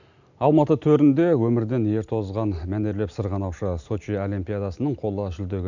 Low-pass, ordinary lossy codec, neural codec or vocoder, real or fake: 7.2 kHz; none; none; real